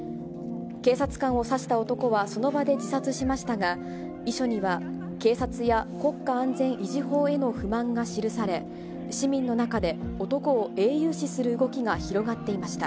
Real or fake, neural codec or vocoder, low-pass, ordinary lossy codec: real; none; none; none